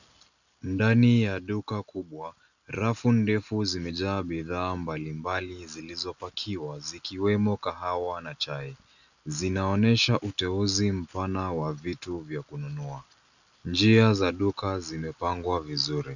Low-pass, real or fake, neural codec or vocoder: 7.2 kHz; real; none